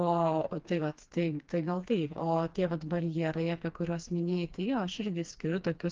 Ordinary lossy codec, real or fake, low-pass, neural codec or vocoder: Opus, 32 kbps; fake; 7.2 kHz; codec, 16 kHz, 2 kbps, FreqCodec, smaller model